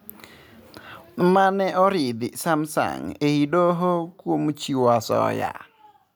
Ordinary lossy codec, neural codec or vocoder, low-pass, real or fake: none; none; none; real